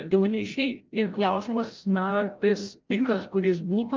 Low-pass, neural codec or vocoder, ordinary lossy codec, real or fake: 7.2 kHz; codec, 16 kHz, 0.5 kbps, FreqCodec, larger model; Opus, 24 kbps; fake